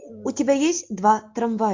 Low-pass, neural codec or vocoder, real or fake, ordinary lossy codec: 7.2 kHz; none; real; MP3, 48 kbps